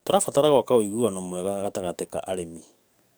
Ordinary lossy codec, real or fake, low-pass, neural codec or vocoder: none; fake; none; codec, 44.1 kHz, 7.8 kbps, DAC